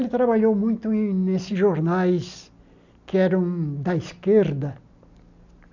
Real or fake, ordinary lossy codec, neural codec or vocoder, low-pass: real; none; none; 7.2 kHz